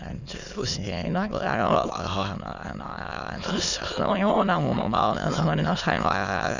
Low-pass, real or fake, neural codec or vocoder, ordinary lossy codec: 7.2 kHz; fake; autoencoder, 22.05 kHz, a latent of 192 numbers a frame, VITS, trained on many speakers; none